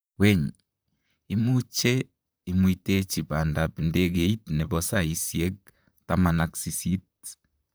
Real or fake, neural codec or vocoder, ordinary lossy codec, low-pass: fake; vocoder, 44.1 kHz, 128 mel bands, Pupu-Vocoder; none; none